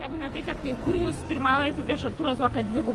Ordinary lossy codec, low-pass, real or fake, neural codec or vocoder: Opus, 24 kbps; 10.8 kHz; fake; codec, 44.1 kHz, 2.6 kbps, SNAC